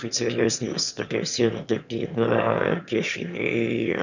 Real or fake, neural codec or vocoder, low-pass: fake; autoencoder, 22.05 kHz, a latent of 192 numbers a frame, VITS, trained on one speaker; 7.2 kHz